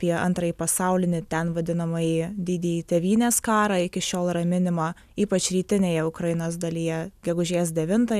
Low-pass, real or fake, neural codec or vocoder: 14.4 kHz; real; none